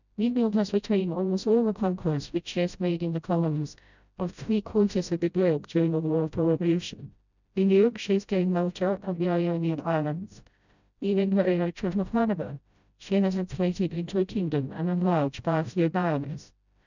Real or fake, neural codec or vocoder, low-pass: fake; codec, 16 kHz, 0.5 kbps, FreqCodec, smaller model; 7.2 kHz